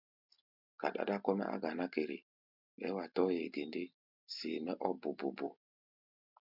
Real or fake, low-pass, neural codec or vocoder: real; 5.4 kHz; none